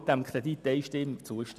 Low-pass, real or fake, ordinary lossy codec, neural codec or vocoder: 14.4 kHz; real; none; none